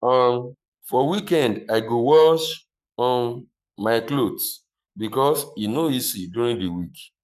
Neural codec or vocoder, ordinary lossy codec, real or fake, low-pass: codec, 44.1 kHz, 7.8 kbps, Pupu-Codec; none; fake; 14.4 kHz